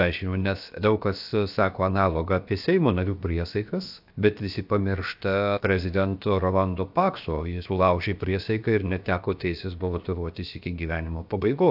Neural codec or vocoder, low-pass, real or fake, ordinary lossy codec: codec, 16 kHz, 0.7 kbps, FocalCodec; 5.4 kHz; fake; AAC, 48 kbps